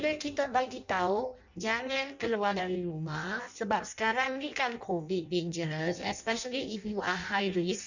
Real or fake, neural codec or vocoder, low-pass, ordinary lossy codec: fake; codec, 16 kHz in and 24 kHz out, 0.6 kbps, FireRedTTS-2 codec; 7.2 kHz; none